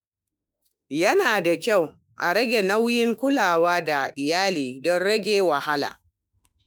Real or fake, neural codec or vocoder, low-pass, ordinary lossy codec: fake; autoencoder, 48 kHz, 32 numbers a frame, DAC-VAE, trained on Japanese speech; none; none